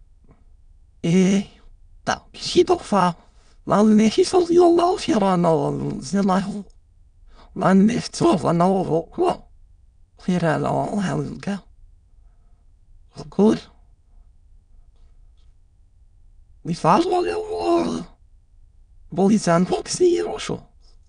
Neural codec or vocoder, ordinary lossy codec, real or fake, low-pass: autoencoder, 22.05 kHz, a latent of 192 numbers a frame, VITS, trained on many speakers; none; fake; 9.9 kHz